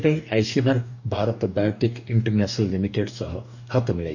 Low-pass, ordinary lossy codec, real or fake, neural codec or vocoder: 7.2 kHz; none; fake; codec, 44.1 kHz, 2.6 kbps, DAC